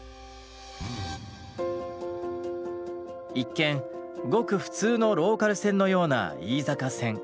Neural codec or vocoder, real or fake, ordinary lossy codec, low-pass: none; real; none; none